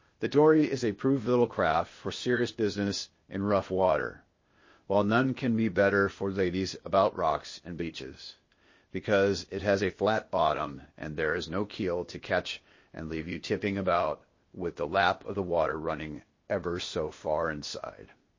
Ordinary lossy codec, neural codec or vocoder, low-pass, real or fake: MP3, 32 kbps; codec, 16 kHz, 0.8 kbps, ZipCodec; 7.2 kHz; fake